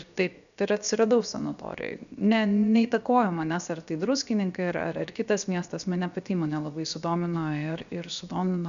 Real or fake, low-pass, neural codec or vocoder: fake; 7.2 kHz; codec, 16 kHz, 0.7 kbps, FocalCodec